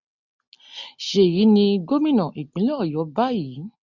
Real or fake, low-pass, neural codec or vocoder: real; 7.2 kHz; none